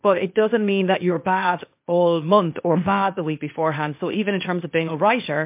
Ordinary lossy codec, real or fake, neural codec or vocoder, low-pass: MP3, 32 kbps; fake; codec, 16 kHz, 0.8 kbps, ZipCodec; 3.6 kHz